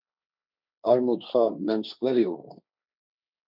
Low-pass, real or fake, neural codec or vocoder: 5.4 kHz; fake; codec, 16 kHz, 1.1 kbps, Voila-Tokenizer